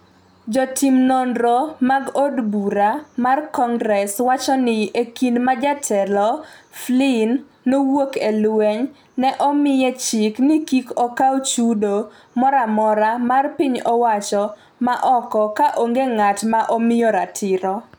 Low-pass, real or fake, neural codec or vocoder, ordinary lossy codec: none; real; none; none